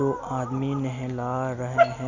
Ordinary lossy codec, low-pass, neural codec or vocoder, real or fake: none; 7.2 kHz; none; real